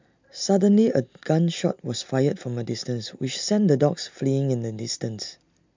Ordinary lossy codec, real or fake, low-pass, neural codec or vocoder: MP3, 64 kbps; real; 7.2 kHz; none